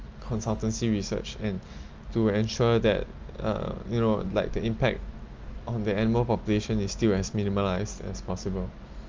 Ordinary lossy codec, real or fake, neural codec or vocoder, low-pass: Opus, 24 kbps; real; none; 7.2 kHz